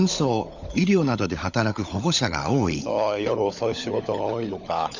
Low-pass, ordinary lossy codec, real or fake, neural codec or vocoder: 7.2 kHz; none; fake; codec, 16 kHz, 16 kbps, FunCodec, trained on LibriTTS, 50 frames a second